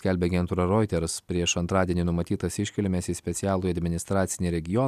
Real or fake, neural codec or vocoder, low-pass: real; none; 14.4 kHz